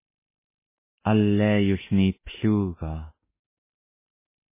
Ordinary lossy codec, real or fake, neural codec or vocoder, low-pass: MP3, 16 kbps; fake; autoencoder, 48 kHz, 32 numbers a frame, DAC-VAE, trained on Japanese speech; 3.6 kHz